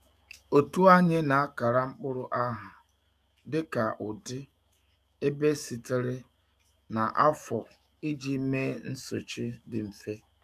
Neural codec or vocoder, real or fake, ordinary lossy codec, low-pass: codec, 44.1 kHz, 7.8 kbps, DAC; fake; none; 14.4 kHz